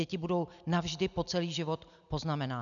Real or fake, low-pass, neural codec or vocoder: real; 7.2 kHz; none